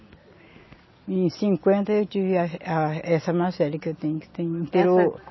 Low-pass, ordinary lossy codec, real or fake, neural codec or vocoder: 7.2 kHz; MP3, 24 kbps; real; none